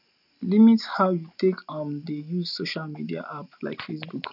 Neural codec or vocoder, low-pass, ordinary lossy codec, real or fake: none; 5.4 kHz; none; real